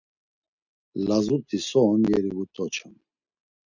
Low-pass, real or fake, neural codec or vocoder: 7.2 kHz; real; none